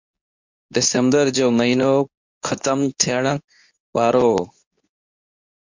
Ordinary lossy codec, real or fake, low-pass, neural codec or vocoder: MP3, 48 kbps; fake; 7.2 kHz; codec, 24 kHz, 0.9 kbps, WavTokenizer, medium speech release version 2